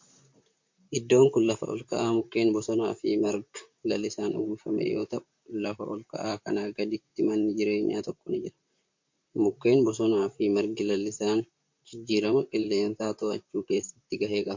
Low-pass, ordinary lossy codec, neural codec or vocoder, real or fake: 7.2 kHz; MP3, 48 kbps; vocoder, 44.1 kHz, 128 mel bands, Pupu-Vocoder; fake